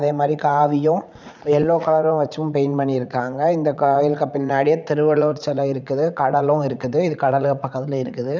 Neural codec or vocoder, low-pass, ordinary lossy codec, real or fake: vocoder, 44.1 kHz, 80 mel bands, Vocos; 7.2 kHz; none; fake